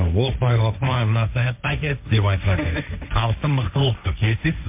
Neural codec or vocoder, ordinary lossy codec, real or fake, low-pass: codec, 16 kHz, 1.1 kbps, Voila-Tokenizer; MP3, 32 kbps; fake; 3.6 kHz